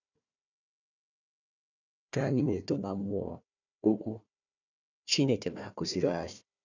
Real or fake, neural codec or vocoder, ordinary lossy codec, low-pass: fake; codec, 16 kHz, 1 kbps, FunCodec, trained on Chinese and English, 50 frames a second; none; 7.2 kHz